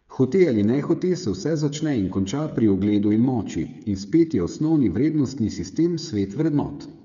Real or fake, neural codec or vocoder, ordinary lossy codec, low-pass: fake; codec, 16 kHz, 8 kbps, FreqCodec, smaller model; none; 7.2 kHz